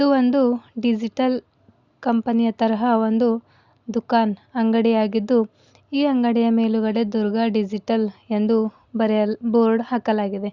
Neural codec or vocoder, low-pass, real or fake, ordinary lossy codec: none; 7.2 kHz; real; Opus, 64 kbps